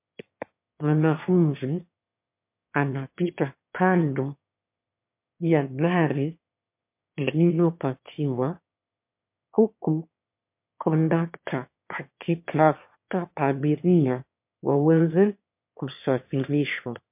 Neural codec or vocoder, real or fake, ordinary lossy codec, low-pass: autoencoder, 22.05 kHz, a latent of 192 numbers a frame, VITS, trained on one speaker; fake; MP3, 32 kbps; 3.6 kHz